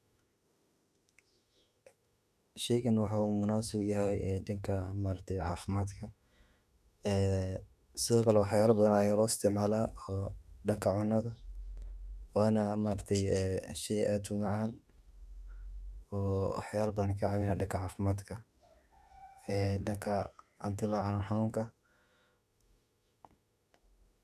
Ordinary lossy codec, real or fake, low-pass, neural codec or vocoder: none; fake; 14.4 kHz; autoencoder, 48 kHz, 32 numbers a frame, DAC-VAE, trained on Japanese speech